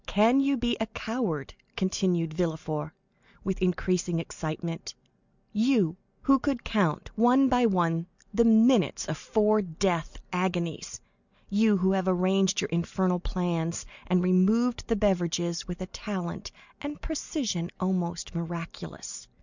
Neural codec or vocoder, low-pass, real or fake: none; 7.2 kHz; real